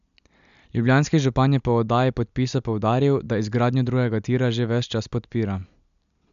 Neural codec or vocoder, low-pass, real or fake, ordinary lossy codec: none; 7.2 kHz; real; none